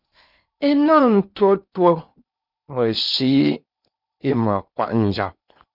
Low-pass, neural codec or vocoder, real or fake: 5.4 kHz; codec, 16 kHz in and 24 kHz out, 0.8 kbps, FocalCodec, streaming, 65536 codes; fake